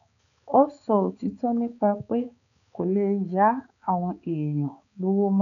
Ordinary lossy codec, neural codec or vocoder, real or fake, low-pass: none; codec, 16 kHz, 4 kbps, X-Codec, HuBERT features, trained on balanced general audio; fake; 7.2 kHz